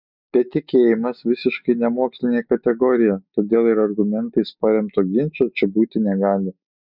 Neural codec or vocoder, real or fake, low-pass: none; real; 5.4 kHz